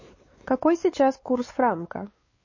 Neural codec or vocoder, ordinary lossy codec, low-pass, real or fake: codec, 16 kHz, 4 kbps, X-Codec, WavLM features, trained on Multilingual LibriSpeech; MP3, 32 kbps; 7.2 kHz; fake